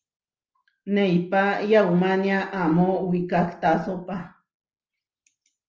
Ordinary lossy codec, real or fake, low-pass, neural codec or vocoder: Opus, 24 kbps; real; 7.2 kHz; none